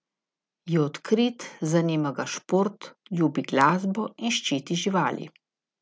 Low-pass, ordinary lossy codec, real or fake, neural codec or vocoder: none; none; real; none